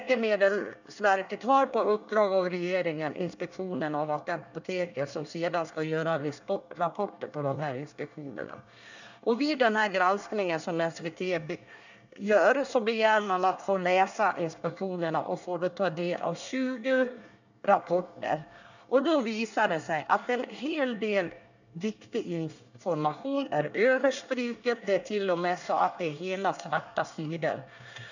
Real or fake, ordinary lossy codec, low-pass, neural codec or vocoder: fake; none; 7.2 kHz; codec, 24 kHz, 1 kbps, SNAC